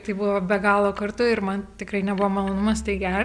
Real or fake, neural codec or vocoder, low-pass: real; none; 9.9 kHz